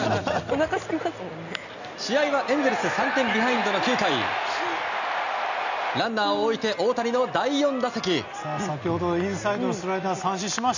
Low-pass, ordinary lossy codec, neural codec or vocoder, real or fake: 7.2 kHz; none; none; real